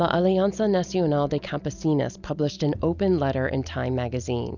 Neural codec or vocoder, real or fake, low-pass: none; real; 7.2 kHz